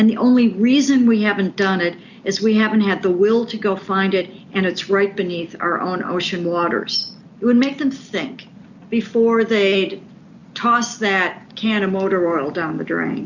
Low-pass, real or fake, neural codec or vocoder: 7.2 kHz; real; none